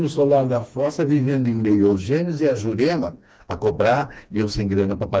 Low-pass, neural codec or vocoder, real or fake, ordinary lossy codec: none; codec, 16 kHz, 2 kbps, FreqCodec, smaller model; fake; none